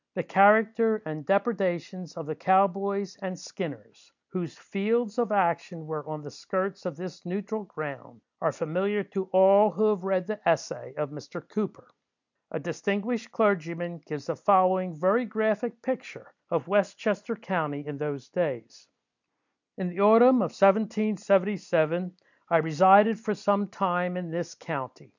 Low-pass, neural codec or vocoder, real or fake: 7.2 kHz; none; real